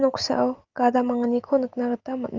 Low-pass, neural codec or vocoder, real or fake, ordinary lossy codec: 7.2 kHz; none; real; Opus, 32 kbps